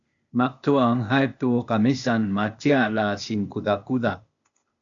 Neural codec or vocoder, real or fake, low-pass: codec, 16 kHz, 0.8 kbps, ZipCodec; fake; 7.2 kHz